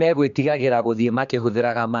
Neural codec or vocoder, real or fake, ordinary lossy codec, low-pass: codec, 16 kHz, 2 kbps, X-Codec, HuBERT features, trained on general audio; fake; MP3, 96 kbps; 7.2 kHz